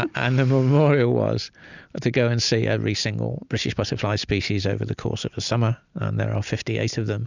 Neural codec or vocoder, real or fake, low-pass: none; real; 7.2 kHz